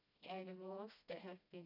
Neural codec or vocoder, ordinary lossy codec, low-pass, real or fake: codec, 16 kHz, 1 kbps, FreqCodec, smaller model; none; 5.4 kHz; fake